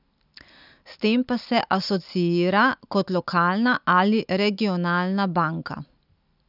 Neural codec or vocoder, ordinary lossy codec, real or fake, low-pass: none; none; real; 5.4 kHz